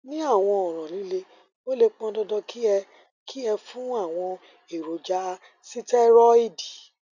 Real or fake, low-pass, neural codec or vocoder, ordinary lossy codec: real; 7.2 kHz; none; none